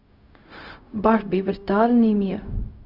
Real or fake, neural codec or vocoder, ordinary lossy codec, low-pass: fake; codec, 16 kHz, 0.4 kbps, LongCat-Audio-Codec; Opus, 64 kbps; 5.4 kHz